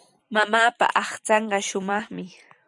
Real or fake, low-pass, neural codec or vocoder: fake; 10.8 kHz; vocoder, 44.1 kHz, 128 mel bands every 256 samples, BigVGAN v2